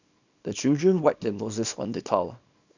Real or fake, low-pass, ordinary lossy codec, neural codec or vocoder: fake; 7.2 kHz; none; codec, 24 kHz, 0.9 kbps, WavTokenizer, small release